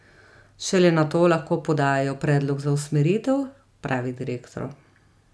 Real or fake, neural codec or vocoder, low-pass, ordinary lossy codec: real; none; none; none